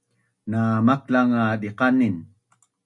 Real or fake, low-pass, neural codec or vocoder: real; 10.8 kHz; none